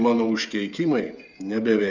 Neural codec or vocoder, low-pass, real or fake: codec, 16 kHz, 8 kbps, FreqCodec, smaller model; 7.2 kHz; fake